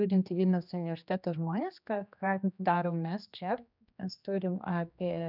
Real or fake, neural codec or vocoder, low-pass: fake; codec, 16 kHz, 2 kbps, X-Codec, HuBERT features, trained on general audio; 5.4 kHz